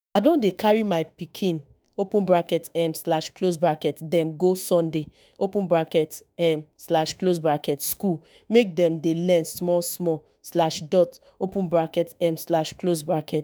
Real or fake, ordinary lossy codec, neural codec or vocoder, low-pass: fake; none; autoencoder, 48 kHz, 32 numbers a frame, DAC-VAE, trained on Japanese speech; none